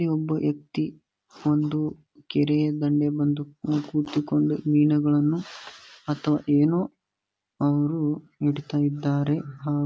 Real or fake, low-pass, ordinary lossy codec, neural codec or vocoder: real; none; none; none